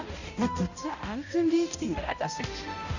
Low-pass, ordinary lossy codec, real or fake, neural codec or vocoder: 7.2 kHz; none; fake; codec, 16 kHz, 0.5 kbps, X-Codec, HuBERT features, trained on balanced general audio